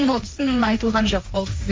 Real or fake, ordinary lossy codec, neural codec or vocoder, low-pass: fake; none; codec, 16 kHz, 1.1 kbps, Voila-Tokenizer; none